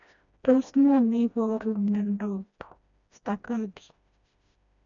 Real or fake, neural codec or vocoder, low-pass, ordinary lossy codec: fake; codec, 16 kHz, 1 kbps, FreqCodec, smaller model; 7.2 kHz; none